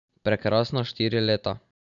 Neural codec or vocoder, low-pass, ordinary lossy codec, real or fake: none; 7.2 kHz; none; real